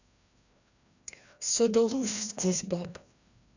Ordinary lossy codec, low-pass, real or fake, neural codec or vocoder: none; 7.2 kHz; fake; codec, 16 kHz, 1 kbps, FreqCodec, larger model